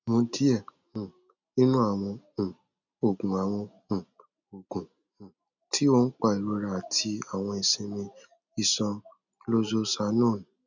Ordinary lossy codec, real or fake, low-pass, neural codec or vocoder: none; real; 7.2 kHz; none